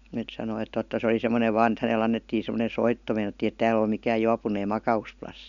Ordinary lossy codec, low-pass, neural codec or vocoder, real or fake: none; 7.2 kHz; none; real